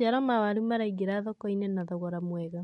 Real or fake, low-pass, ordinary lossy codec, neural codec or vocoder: real; 19.8 kHz; MP3, 48 kbps; none